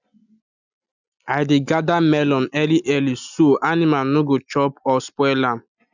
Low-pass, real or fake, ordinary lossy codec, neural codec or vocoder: 7.2 kHz; real; none; none